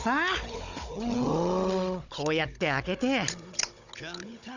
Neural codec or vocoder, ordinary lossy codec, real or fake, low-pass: codec, 16 kHz, 16 kbps, FunCodec, trained on Chinese and English, 50 frames a second; none; fake; 7.2 kHz